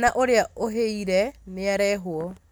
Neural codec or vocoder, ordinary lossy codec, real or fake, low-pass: none; none; real; none